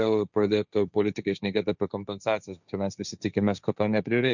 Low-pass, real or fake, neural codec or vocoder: 7.2 kHz; fake; codec, 16 kHz, 1.1 kbps, Voila-Tokenizer